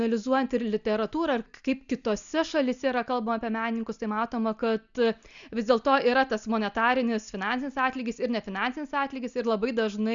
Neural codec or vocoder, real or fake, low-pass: none; real; 7.2 kHz